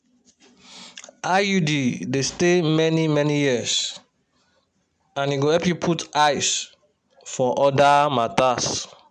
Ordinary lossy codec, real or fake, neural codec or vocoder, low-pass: MP3, 96 kbps; real; none; 9.9 kHz